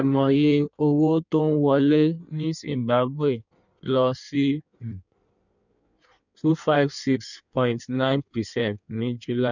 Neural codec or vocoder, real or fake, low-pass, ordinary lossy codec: codec, 16 kHz in and 24 kHz out, 1.1 kbps, FireRedTTS-2 codec; fake; 7.2 kHz; none